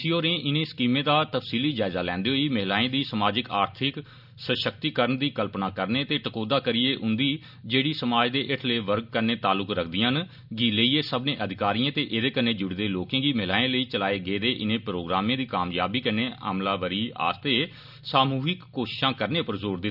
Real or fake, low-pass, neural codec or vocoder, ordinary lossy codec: real; 5.4 kHz; none; none